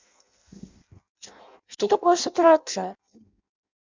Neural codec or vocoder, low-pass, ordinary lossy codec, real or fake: codec, 16 kHz in and 24 kHz out, 0.6 kbps, FireRedTTS-2 codec; 7.2 kHz; MP3, 64 kbps; fake